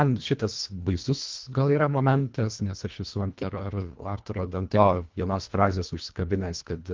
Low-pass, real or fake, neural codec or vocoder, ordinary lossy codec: 7.2 kHz; fake; codec, 24 kHz, 1.5 kbps, HILCodec; Opus, 32 kbps